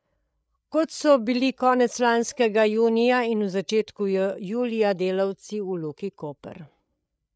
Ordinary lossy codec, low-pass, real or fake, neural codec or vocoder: none; none; fake; codec, 16 kHz, 8 kbps, FreqCodec, larger model